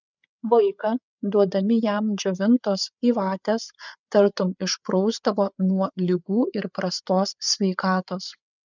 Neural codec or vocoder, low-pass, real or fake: codec, 16 kHz, 8 kbps, FreqCodec, larger model; 7.2 kHz; fake